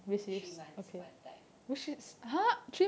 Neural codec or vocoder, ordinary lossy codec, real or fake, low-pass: none; none; real; none